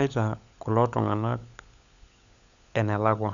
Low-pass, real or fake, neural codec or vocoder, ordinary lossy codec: 7.2 kHz; fake; codec, 16 kHz, 16 kbps, FunCodec, trained on LibriTTS, 50 frames a second; none